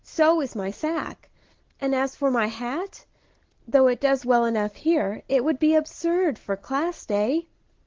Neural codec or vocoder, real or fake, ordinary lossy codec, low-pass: none; real; Opus, 16 kbps; 7.2 kHz